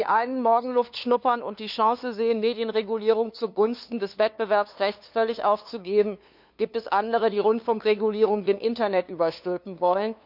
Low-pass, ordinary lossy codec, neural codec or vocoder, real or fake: 5.4 kHz; none; codec, 16 kHz, 2 kbps, FunCodec, trained on LibriTTS, 25 frames a second; fake